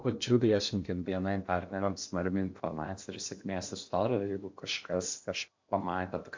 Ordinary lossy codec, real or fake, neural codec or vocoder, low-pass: MP3, 64 kbps; fake; codec, 16 kHz in and 24 kHz out, 0.8 kbps, FocalCodec, streaming, 65536 codes; 7.2 kHz